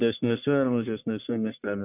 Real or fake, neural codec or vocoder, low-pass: fake; codec, 44.1 kHz, 1.7 kbps, Pupu-Codec; 3.6 kHz